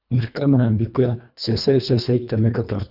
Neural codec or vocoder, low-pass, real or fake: codec, 24 kHz, 1.5 kbps, HILCodec; 5.4 kHz; fake